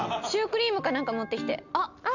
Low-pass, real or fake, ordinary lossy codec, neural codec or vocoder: 7.2 kHz; real; none; none